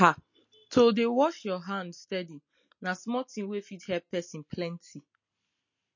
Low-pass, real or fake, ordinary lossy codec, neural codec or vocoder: 7.2 kHz; real; MP3, 32 kbps; none